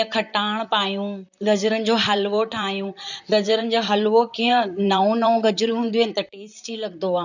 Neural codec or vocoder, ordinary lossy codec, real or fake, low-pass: vocoder, 44.1 kHz, 128 mel bands, Pupu-Vocoder; none; fake; 7.2 kHz